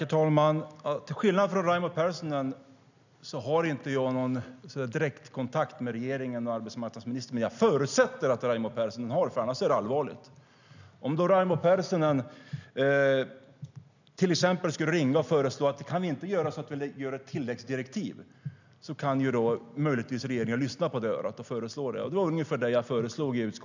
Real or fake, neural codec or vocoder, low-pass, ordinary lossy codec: real; none; 7.2 kHz; none